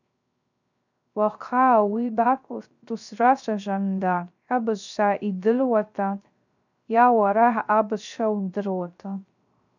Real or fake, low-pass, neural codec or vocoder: fake; 7.2 kHz; codec, 16 kHz, 0.3 kbps, FocalCodec